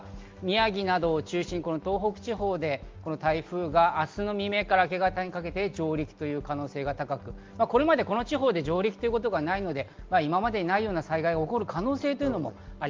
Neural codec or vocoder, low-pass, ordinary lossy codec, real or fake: none; 7.2 kHz; Opus, 32 kbps; real